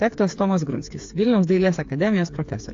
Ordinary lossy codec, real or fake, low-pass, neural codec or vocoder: AAC, 48 kbps; fake; 7.2 kHz; codec, 16 kHz, 4 kbps, FreqCodec, smaller model